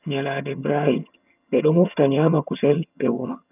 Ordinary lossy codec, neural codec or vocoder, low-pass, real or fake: none; vocoder, 22.05 kHz, 80 mel bands, HiFi-GAN; 3.6 kHz; fake